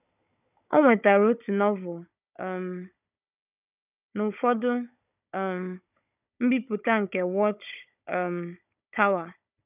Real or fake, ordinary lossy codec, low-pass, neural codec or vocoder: fake; none; 3.6 kHz; codec, 16 kHz, 16 kbps, FunCodec, trained on Chinese and English, 50 frames a second